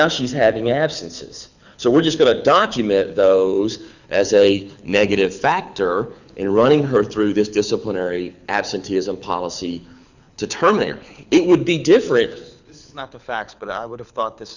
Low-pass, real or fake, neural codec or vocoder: 7.2 kHz; fake; codec, 24 kHz, 6 kbps, HILCodec